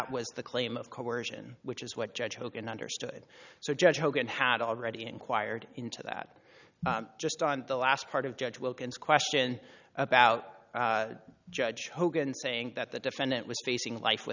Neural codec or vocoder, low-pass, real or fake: none; 7.2 kHz; real